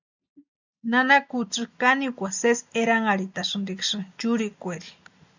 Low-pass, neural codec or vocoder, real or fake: 7.2 kHz; none; real